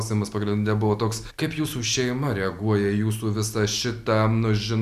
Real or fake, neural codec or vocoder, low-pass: real; none; 14.4 kHz